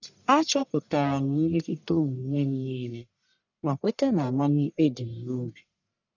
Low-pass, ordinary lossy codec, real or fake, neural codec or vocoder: 7.2 kHz; none; fake; codec, 44.1 kHz, 1.7 kbps, Pupu-Codec